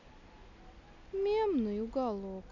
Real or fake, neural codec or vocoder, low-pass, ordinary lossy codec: real; none; 7.2 kHz; none